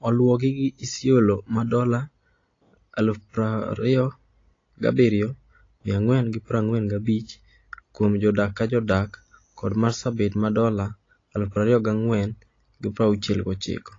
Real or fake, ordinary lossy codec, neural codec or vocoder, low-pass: real; AAC, 32 kbps; none; 7.2 kHz